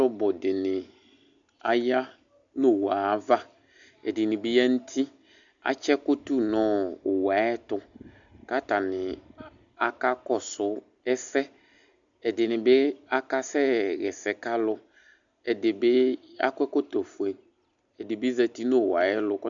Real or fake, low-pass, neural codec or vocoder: real; 7.2 kHz; none